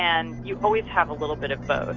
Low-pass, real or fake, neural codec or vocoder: 7.2 kHz; real; none